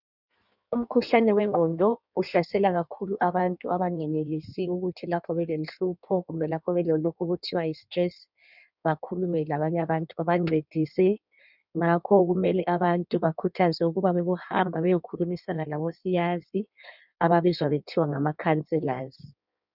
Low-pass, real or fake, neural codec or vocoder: 5.4 kHz; fake; codec, 16 kHz in and 24 kHz out, 1.1 kbps, FireRedTTS-2 codec